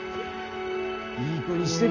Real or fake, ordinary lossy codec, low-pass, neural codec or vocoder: real; none; 7.2 kHz; none